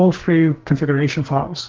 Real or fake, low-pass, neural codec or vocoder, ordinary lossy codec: fake; 7.2 kHz; codec, 44.1 kHz, 2.6 kbps, SNAC; Opus, 16 kbps